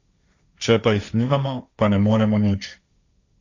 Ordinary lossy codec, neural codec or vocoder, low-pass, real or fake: Opus, 64 kbps; codec, 16 kHz, 1.1 kbps, Voila-Tokenizer; 7.2 kHz; fake